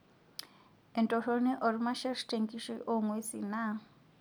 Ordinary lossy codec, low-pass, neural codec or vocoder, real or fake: none; none; none; real